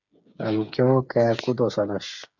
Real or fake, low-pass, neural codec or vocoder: fake; 7.2 kHz; codec, 16 kHz, 8 kbps, FreqCodec, smaller model